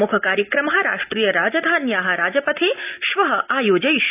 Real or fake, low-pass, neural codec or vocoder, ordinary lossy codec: real; 3.6 kHz; none; none